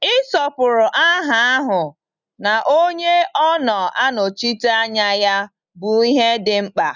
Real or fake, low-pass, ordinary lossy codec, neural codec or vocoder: real; 7.2 kHz; none; none